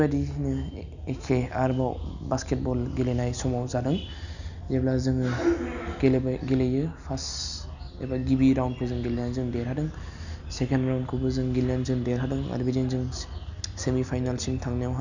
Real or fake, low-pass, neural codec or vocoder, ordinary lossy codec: real; 7.2 kHz; none; none